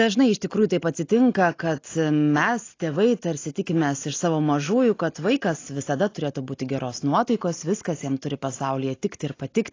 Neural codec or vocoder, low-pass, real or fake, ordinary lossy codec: none; 7.2 kHz; real; AAC, 32 kbps